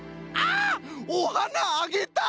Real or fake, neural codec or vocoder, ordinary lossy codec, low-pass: real; none; none; none